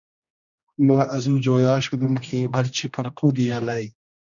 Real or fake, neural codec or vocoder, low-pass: fake; codec, 16 kHz, 1 kbps, X-Codec, HuBERT features, trained on general audio; 7.2 kHz